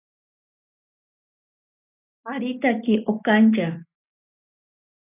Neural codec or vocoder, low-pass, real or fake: none; 3.6 kHz; real